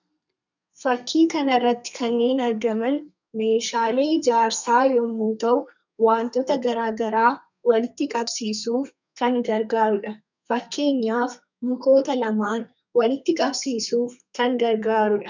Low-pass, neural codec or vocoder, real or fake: 7.2 kHz; codec, 32 kHz, 1.9 kbps, SNAC; fake